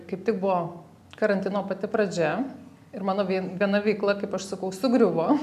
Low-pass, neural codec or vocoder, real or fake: 14.4 kHz; none; real